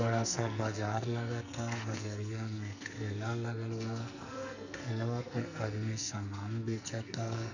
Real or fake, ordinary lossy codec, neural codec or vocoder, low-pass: fake; none; codec, 44.1 kHz, 2.6 kbps, SNAC; 7.2 kHz